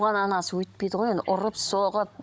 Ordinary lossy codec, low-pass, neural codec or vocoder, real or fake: none; none; codec, 16 kHz, 16 kbps, FunCodec, trained on Chinese and English, 50 frames a second; fake